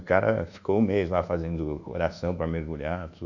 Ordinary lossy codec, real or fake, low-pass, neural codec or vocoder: none; fake; 7.2 kHz; codec, 24 kHz, 1.2 kbps, DualCodec